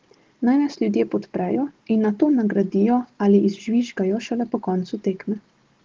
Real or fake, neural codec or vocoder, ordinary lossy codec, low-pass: real; none; Opus, 16 kbps; 7.2 kHz